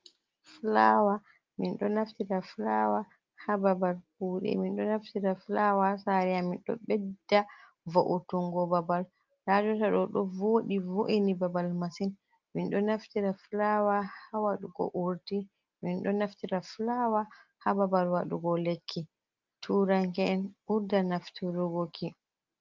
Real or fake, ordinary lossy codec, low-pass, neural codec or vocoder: real; Opus, 24 kbps; 7.2 kHz; none